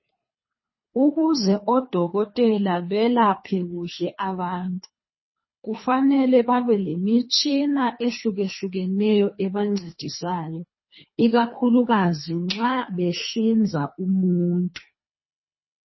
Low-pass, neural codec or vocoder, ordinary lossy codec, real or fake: 7.2 kHz; codec, 24 kHz, 3 kbps, HILCodec; MP3, 24 kbps; fake